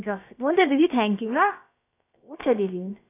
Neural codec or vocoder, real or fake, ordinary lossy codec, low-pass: codec, 16 kHz, about 1 kbps, DyCAST, with the encoder's durations; fake; AAC, 24 kbps; 3.6 kHz